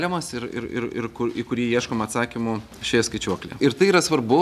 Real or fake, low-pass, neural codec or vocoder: real; 14.4 kHz; none